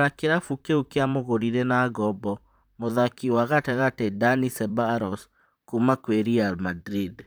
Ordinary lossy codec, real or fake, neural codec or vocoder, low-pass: none; fake; vocoder, 44.1 kHz, 128 mel bands, Pupu-Vocoder; none